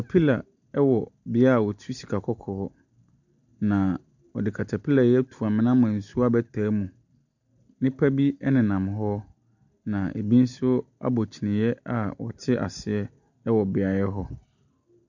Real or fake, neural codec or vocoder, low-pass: real; none; 7.2 kHz